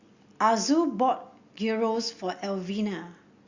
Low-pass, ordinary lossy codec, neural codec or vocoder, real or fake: 7.2 kHz; Opus, 64 kbps; none; real